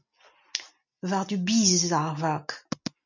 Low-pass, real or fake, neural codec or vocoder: 7.2 kHz; real; none